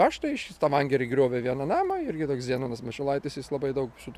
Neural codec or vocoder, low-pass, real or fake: none; 14.4 kHz; real